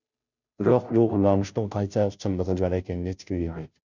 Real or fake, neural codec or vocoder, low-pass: fake; codec, 16 kHz, 0.5 kbps, FunCodec, trained on Chinese and English, 25 frames a second; 7.2 kHz